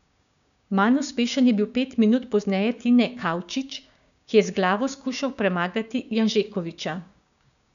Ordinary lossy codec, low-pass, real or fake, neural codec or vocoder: none; 7.2 kHz; fake; codec, 16 kHz, 6 kbps, DAC